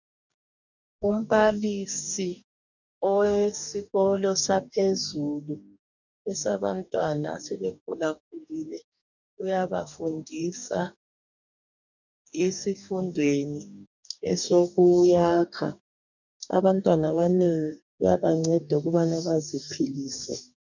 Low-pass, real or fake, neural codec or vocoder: 7.2 kHz; fake; codec, 44.1 kHz, 2.6 kbps, DAC